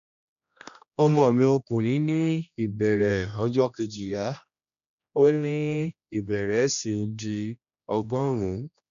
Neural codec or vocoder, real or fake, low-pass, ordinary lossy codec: codec, 16 kHz, 1 kbps, X-Codec, HuBERT features, trained on general audio; fake; 7.2 kHz; MP3, 96 kbps